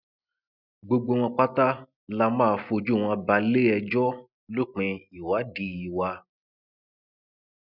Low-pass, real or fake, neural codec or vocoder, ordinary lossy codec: 5.4 kHz; real; none; none